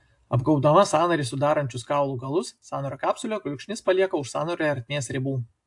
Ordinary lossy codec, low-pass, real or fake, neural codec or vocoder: AAC, 64 kbps; 10.8 kHz; real; none